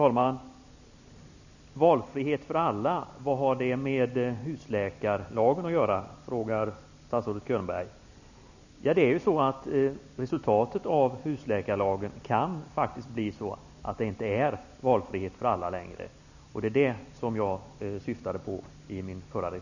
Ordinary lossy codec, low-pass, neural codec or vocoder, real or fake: none; 7.2 kHz; none; real